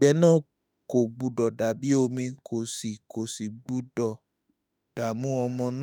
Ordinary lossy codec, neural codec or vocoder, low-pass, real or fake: none; autoencoder, 48 kHz, 32 numbers a frame, DAC-VAE, trained on Japanese speech; none; fake